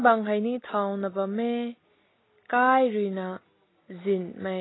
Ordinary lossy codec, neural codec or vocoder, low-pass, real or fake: AAC, 16 kbps; none; 7.2 kHz; real